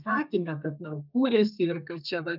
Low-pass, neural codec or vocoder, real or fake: 5.4 kHz; codec, 32 kHz, 1.9 kbps, SNAC; fake